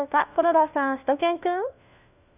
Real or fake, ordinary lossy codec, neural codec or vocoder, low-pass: fake; none; codec, 16 kHz, 1 kbps, FunCodec, trained on LibriTTS, 50 frames a second; 3.6 kHz